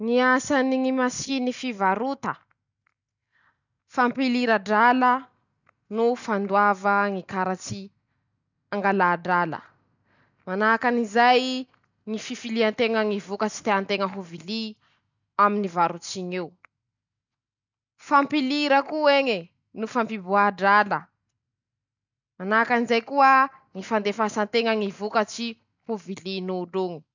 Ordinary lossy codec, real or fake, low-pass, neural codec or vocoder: none; real; 7.2 kHz; none